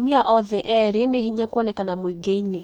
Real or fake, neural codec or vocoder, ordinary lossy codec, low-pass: fake; codec, 44.1 kHz, 2.6 kbps, DAC; none; 19.8 kHz